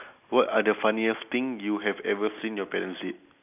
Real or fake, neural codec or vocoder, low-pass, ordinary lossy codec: real; none; 3.6 kHz; none